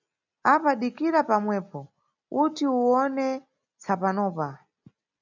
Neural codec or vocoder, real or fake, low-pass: none; real; 7.2 kHz